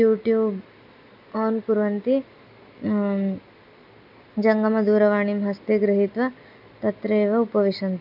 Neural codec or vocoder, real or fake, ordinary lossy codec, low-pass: none; real; none; 5.4 kHz